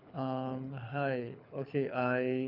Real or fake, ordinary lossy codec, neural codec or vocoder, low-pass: fake; none; codec, 24 kHz, 6 kbps, HILCodec; 5.4 kHz